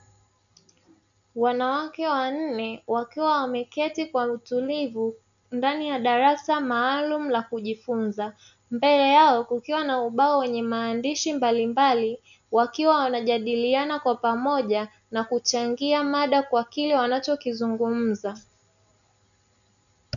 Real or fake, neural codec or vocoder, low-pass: real; none; 7.2 kHz